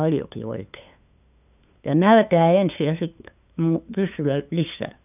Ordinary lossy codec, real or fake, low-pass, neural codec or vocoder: none; fake; 3.6 kHz; codec, 16 kHz, 2 kbps, FunCodec, trained on LibriTTS, 25 frames a second